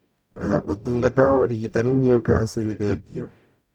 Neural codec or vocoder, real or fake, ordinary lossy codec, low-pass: codec, 44.1 kHz, 0.9 kbps, DAC; fake; none; 19.8 kHz